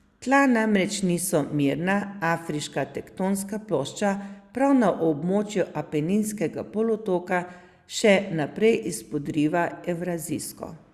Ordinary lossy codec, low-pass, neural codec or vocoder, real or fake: Opus, 64 kbps; 14.4 kHz; none; real